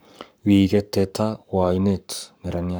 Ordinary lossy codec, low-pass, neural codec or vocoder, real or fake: none; none; codec, 44.1 kHz, 7.8 kbps, Pupu-Codec; fake